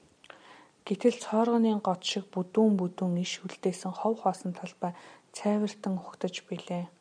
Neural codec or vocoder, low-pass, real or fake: none; 9.9 kHz; real